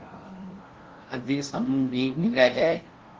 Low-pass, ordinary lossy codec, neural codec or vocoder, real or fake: 7.2 kHz; Opus, 16 kbps; codec, 16 kHz, 0.5 kbps, FunCodec, trained on LibriTTS, 25 frames a second; fake